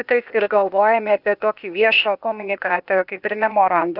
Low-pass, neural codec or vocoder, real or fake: 5.4 kHz; codec, 16 kHz, 0.8 kbps, ZipCodec; fake